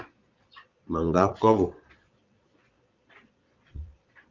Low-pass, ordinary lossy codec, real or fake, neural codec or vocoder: 7.2 kHz; Opus, 32 kbps; fake; vocoder, 22.05 kHz, 80 mel bands, Vocos